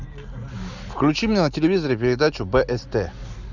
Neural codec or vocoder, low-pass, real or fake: none; 7.2 kHz; real